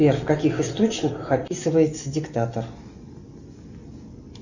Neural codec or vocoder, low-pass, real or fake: none; 7.2 kHz; real